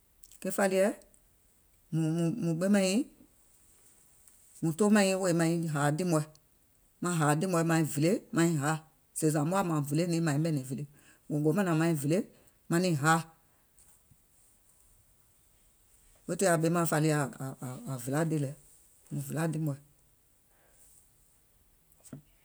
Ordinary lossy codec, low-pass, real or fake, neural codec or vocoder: none; none; real; none